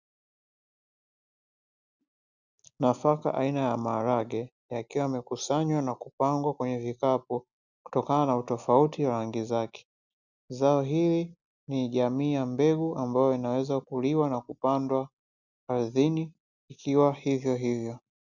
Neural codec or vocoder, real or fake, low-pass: none; real; 7.2 kHz